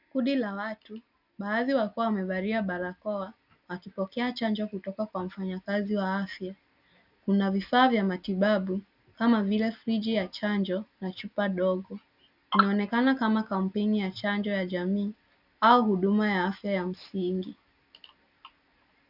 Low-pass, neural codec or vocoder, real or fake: 5.4 kHz; none; real